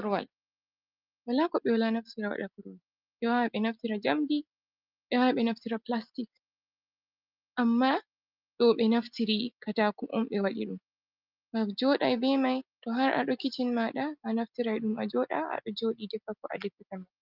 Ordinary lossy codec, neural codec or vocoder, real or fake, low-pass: Opus, 24 kbps; none; real; 5.4 kHz